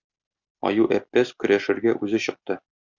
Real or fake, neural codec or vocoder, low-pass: real; none; 7.2 kHz